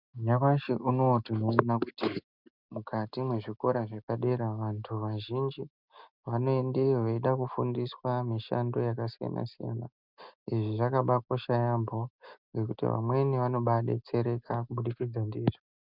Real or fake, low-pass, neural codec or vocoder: real; 5.4 kHz; none